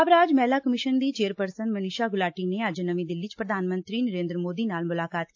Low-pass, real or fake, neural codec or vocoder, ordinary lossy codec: 7.2 kHz; real; none; AAC, 48 kbps